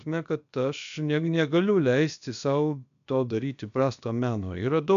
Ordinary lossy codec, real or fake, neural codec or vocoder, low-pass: AAC, 96 kbps; fake; codec, 16 kHz, about 1 kbps, DyCAST, with the encoder's durations; 7.2 kHz